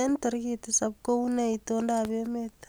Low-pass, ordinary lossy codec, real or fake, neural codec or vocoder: none; none; real; none